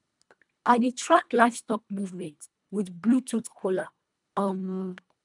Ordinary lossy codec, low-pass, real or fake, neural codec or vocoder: none; 10.8 kHz; fake; codec, 24 kHz, 1.5 kbps, HILCodec